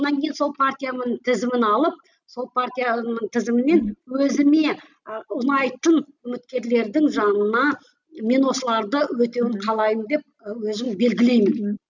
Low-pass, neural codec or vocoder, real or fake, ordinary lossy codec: 7.2 kHz; none; real; none